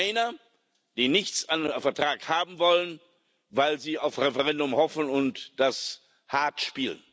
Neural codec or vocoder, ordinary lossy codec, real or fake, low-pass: none; none; real; none